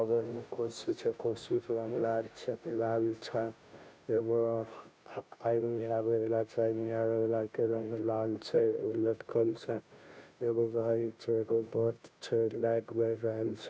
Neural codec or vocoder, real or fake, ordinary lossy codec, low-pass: codec, 16 kHz, 0.5 kbps, FunCodec, trained on Chinese and English, 25 frames a second; fake; none; none